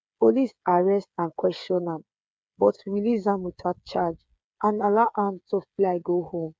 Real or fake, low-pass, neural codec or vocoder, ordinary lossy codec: fake; none; codec, 16 kHz, 8 kbps, FreqCodec, smaller model; none